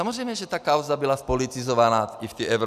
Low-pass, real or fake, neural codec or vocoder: 14.4 kHz; real; none